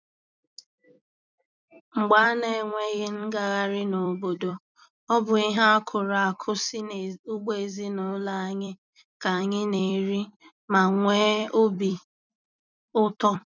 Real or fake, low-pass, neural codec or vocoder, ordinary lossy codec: fake; 7.2 kHz; vocoder, 44.1 kHz, 128 mel bands every 256 samples, BigVGAN v2; none